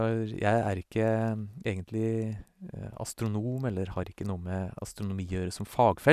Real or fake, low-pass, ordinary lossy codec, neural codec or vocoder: real; 14.4 kHz; none; none